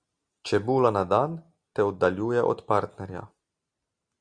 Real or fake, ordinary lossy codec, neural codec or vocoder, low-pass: real; Opus, 64 kbps; none; 9.9 kHz